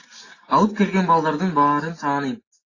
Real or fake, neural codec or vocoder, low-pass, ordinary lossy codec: real; none; 7.2 kHz; AAC, 32 kbps